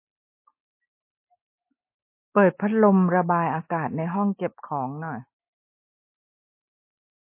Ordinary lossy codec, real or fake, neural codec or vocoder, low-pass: MP3, 24 kbps; real; none; 3.6 kHz